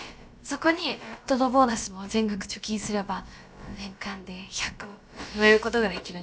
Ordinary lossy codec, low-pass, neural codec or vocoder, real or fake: none; none; codec, 16 kHz, about 1 kbps, DyCAST, with the encoder's durations; fake